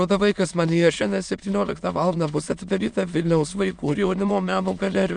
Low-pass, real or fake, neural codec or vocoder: 9.9 kHz; fake; autoencoder, 22.05 kHz, a latent of 192 numbers a frame, VITS, trained on many speakers